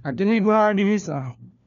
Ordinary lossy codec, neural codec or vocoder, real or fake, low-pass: none; codec, 16 kHz, 1 kbps, FunCodec, trained on LibriTTS, 50 frames a second; fake; 7.2 kHz